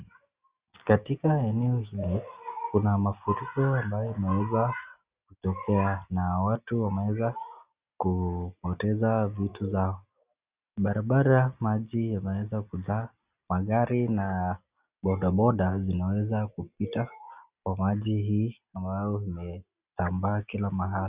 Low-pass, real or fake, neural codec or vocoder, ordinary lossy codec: 3.6 kHz; real; none; Opus, 64 kbps